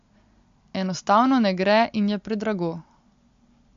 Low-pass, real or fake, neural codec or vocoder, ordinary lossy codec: 7.2 kHz; real; none; MP3, 48 kbps